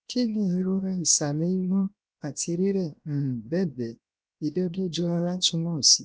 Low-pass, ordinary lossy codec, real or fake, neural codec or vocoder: none; none; fake; codec, 16 kHz, 0.7 kbps, FocalCodec